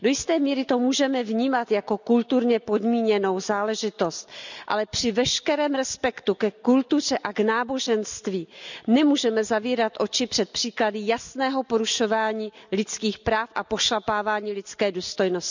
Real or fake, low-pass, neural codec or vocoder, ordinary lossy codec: real; 7.2 kHz; none; none